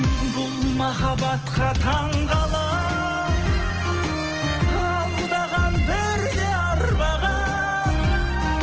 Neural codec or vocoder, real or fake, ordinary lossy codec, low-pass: none; real; Opus, 16 kbps; 7.2 kHz